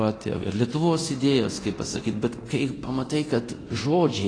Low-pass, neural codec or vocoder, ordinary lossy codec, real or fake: 9.9 kHz; codec, 24 kHz, 0.9 kbps, DualCodec; AAC, 32 kbps; fake